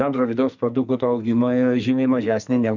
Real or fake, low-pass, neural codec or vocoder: fake; 7.2 kHz; codec, 44.1 kHz, 2.6 kbps, SNAC